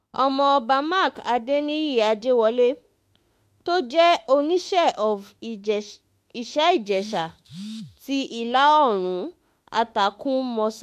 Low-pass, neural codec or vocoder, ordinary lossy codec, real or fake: 14.4 kHz; autoencoder, 48 kHz, 32 numbers a frame, DAC-VAE, trained on Japanese speech; MP3, 64 kbps; fake